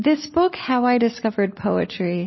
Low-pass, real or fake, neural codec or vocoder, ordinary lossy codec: 7.2 kHz; real; none; MP3, 24 kbps